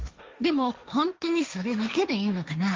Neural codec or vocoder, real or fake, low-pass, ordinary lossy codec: codec, 16 kHz, 1.1 kbps, Voila-Tokenizer; fake; 7.2 kHz; Opus, 32 kbps